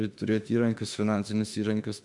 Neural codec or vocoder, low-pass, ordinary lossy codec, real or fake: autoencoder, 48 kHz, 32 numbers a frame, DAC-VAE, trained on Japanese speech; 10.8 kHz; MP3, 64 kbps; fake